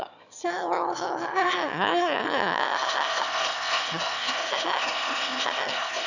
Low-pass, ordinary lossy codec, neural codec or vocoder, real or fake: 7.2 kHz; none; autoencoder, 22.05 kHz, a latent of 192 numbers a frame, VITS, trained on one speaker; fake